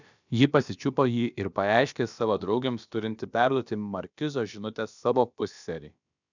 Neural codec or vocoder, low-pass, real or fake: codec, 16 kHz, about 1 kbps, DyCAST, with the encoder's durations; 7.2 kHz; fake